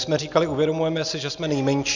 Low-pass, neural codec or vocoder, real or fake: 7.2 kHz; none; real